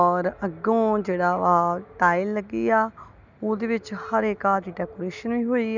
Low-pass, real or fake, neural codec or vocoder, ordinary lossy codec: 7.2 kHz; real; none; none